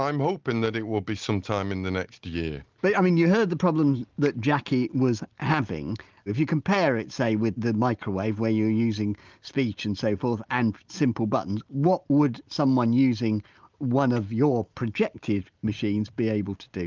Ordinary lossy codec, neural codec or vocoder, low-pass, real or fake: Opus, 24 kbps; none; 7.2 kHz; real